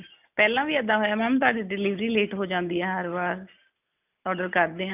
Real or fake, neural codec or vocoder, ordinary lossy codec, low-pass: real; none; none; 3.6 kHz